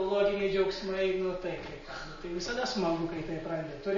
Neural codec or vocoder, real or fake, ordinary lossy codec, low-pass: none; real; MP3, 32 kbps; 7.2 kHz